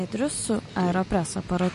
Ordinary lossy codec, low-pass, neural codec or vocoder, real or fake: MP3, 48 kbps; 10.8 kHz; none; real